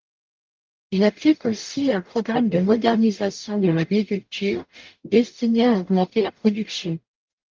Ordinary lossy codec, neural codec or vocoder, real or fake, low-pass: Opus, 32 kbps; codec, 44.1 kHz, 0.9 kbps, DAC; fake; 7.2 kHz